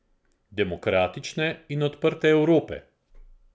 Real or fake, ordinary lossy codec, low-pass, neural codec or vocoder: real; none; none; none